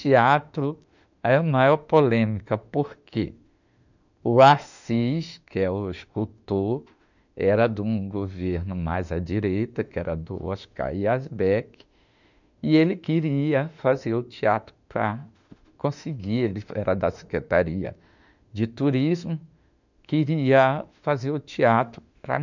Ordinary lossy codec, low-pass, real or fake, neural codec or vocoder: none; 7.2 kHz; fake; autoencoder, 48 kHz, 32 numbers a frame, DAC-VAE, trained on Japanese speech